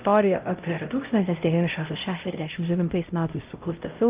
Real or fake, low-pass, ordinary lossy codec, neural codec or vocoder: fake; 3.6 kHz; Opus, 24 kbps; codec, 16 kHz, 0.5 kbps, X-Codec, HuBERT features, trained on LibriSpeech